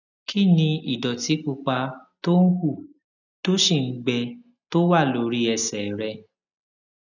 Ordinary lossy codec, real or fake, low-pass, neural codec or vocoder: none; real; 7.2 kHz; none